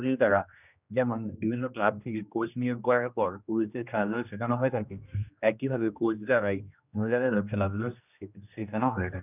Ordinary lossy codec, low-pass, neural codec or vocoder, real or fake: none; 3.6 kHz; codec, 16 kHz, 1 kbps, X-Codec, HuBERT features, trained on general audio; fake